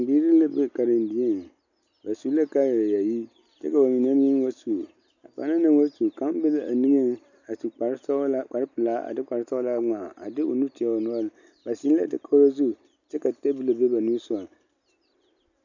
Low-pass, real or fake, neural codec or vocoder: 7.2 kHz; real; none